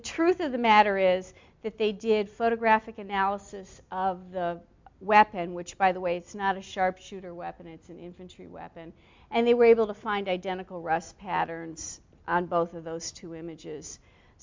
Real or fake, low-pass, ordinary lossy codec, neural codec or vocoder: real; 7.2 kHz; MP3, 64 kbps; none